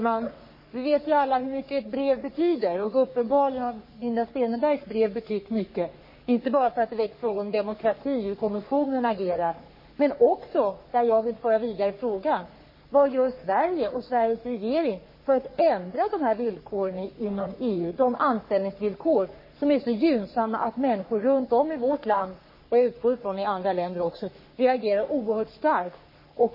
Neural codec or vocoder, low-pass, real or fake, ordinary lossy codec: codec, 44.1 kHz, 3.4 kbps, Pupu-Codec; 5.4 kHz; fake; MP3, 24 kbps